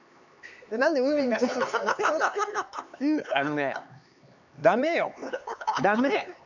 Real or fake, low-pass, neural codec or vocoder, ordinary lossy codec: fake; 7.2 kHz; codec, 16 kHz, 4 kbps, X-Codec, HuBERT features, trained on LibriSpeech; none